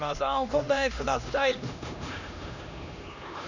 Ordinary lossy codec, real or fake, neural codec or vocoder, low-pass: none; fake; codec, 16 kHz, 1 kbps, X-Codec, HuBERT features, trained on LibriSpeech; 7.2 kHz